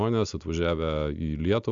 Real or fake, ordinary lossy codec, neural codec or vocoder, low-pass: real; AAC, 64 kbps; none; 7.2 kHz